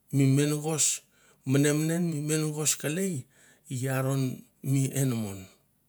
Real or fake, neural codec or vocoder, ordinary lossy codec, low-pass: fake; vocoder, 48 kHz, 128 mel bands, Vocos; none; none